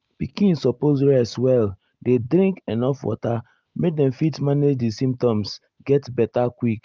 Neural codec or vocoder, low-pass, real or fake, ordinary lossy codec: none; 7.2 kHz; real; Opus, 32 kbps